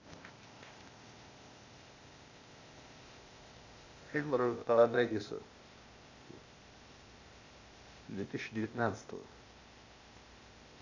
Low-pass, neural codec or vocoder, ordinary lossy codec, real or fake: 7.2 kHz; codec, 16 kHz, 0.8 kbps, ZipCodec; Opus, 64 kbps; fake